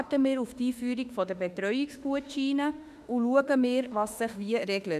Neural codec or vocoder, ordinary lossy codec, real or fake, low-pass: autoencoder, 48 kHz, 32 numbers a frame, DAC-VAE, trained on Japanese speech; none; fake; 14.4 kHz